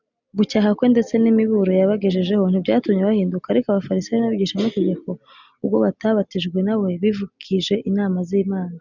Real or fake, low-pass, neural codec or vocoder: real; 7.2 kHz; none